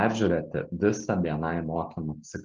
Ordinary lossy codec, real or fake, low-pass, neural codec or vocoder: Opus, 24 kbps; real; 7.2 kHz; none